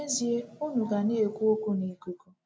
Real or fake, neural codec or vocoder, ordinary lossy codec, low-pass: real; none; none; none